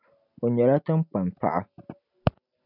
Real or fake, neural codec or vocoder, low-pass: real; none; 5.4 kHz